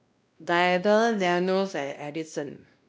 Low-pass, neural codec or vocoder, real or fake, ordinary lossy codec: none; codec, 16 kHz, 1 kbps, X-Codec, WavLM features, trained on Multilingual LibriSpeech; fake; none